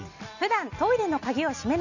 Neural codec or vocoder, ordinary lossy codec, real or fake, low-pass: none; none; real; 7.2 kHz